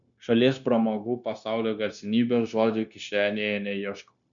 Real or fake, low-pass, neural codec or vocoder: fake; 7.2 kHz; codec, 16 kHz, 0.9 kbps, LongCat-Audio-Codec